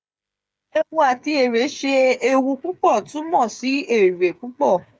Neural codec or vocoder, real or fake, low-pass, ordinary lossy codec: codec, 16 kHz, 8 kbps, FreqCodec, smaller model; fake; none; none